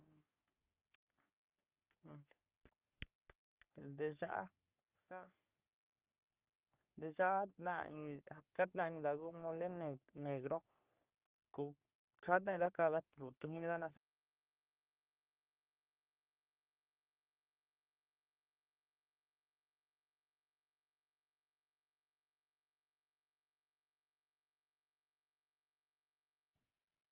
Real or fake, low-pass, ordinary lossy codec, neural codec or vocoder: fake; 3.6 kHz; Opus, 32 kbps; codec, 44.1 kHz, 3.4 kbps, Pupu-Codec